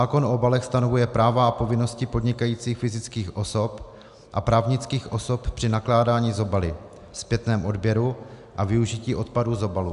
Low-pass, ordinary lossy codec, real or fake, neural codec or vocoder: 10.8 kHz; AAC, 96 kbps; real; none